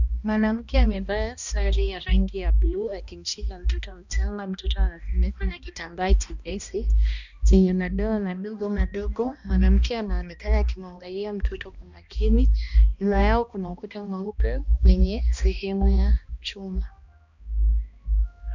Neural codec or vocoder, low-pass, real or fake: codec, 16 kHz, 1 kbps, X-Codec, HuBERT features, trained on balanced general audio; 7.2 kHz; fake